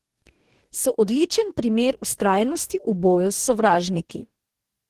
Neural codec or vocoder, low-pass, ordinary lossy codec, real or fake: codec, 44.1 kHz, 2.6 kbps, DAC; 14.4 kHz; Opus, 16 kbps; fake